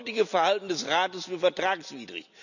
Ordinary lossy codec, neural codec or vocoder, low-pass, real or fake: none; none; 7.2 kHz; real